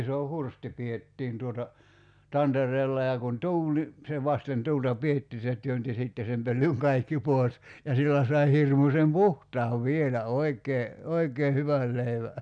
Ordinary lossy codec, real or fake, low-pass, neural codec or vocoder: none; real; 9.9 kHz; none